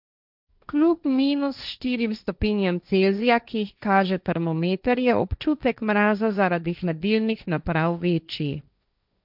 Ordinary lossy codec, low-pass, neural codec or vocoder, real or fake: none; 5.4 kHz; codec, 16 kHz, 1.1 kbps, Voila-Tokenizer; fake